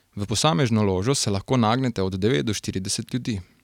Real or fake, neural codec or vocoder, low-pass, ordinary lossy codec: real; none; 19.8 kHz; none